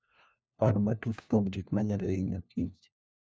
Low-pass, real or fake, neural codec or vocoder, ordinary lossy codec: none; fake; codec, 16 kHz, 1 kbps, FunCodec, trained on LibriTTS, 50 frames a second; none